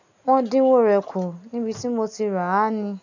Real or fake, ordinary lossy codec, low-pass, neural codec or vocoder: real; none; 7.2 kHz; none